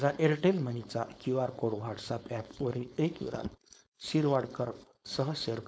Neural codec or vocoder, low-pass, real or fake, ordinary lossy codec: codec, 16 kHz, 4.8 kbps, FACodec; none; fake; none